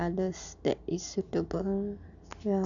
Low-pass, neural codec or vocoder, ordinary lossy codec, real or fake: 7.2 kHz; none; none; real